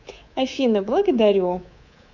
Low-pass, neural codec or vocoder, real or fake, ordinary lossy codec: 7.2 kHz; codec, 24 kHz, 3.1 kbps, DualCodec; fake; none